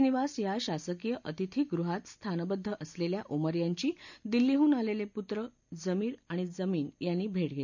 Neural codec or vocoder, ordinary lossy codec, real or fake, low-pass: none; none; real; 7.2 kHz